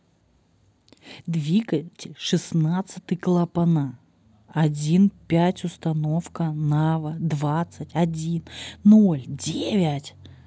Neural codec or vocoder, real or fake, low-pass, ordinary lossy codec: none; real; none; none